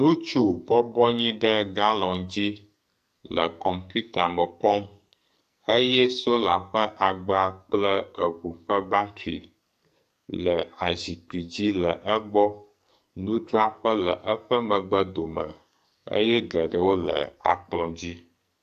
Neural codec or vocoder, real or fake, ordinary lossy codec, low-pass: codec, 44.1 kHz, 2.6 kbps, SNAC; fake; AAC, 96 kbps; 14.4 kHz